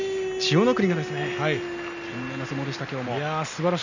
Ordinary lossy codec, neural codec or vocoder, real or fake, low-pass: none; none; real; 7.2 kHz